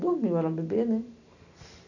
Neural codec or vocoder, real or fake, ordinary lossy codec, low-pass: none; real; none; 7.2 kHz